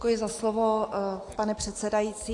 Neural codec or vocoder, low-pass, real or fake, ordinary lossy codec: none; 10.8 kHz; real; AAC, 48 kbps